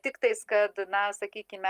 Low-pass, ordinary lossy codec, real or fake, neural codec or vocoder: 14.4 kHz; Opus, 32 kbps; real; none